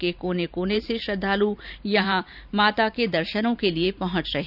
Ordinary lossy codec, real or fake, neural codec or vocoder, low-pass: none; fake; vocoder, 44.1 kHz, 128 mel bands every 512 samples, BigVGAN v2; 5.4 kHz